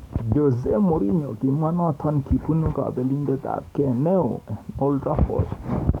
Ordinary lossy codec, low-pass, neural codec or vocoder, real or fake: none; 19.8 kHz; codec, 44.1 kHz, 7.8 kbps, DAC; fake